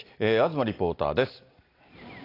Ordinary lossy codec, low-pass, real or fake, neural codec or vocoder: AAC, 32 kbps; 5.4 kHz; real; none